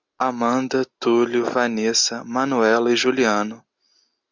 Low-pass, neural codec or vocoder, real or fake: 7.2 kHz; none; real